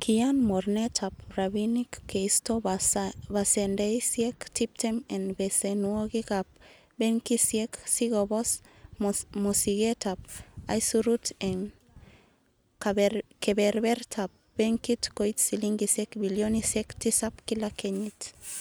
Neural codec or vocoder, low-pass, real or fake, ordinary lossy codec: none; none; real; none